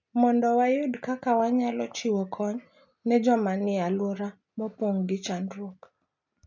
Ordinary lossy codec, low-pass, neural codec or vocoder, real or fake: MP3, 64 kbps; 7.2 kHz; none; real